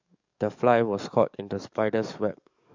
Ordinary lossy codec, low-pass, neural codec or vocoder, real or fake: MP3, 64 kbps; 7.2 kHz; codec, 44.1 kHz, 7.8 kbps, DAC; fake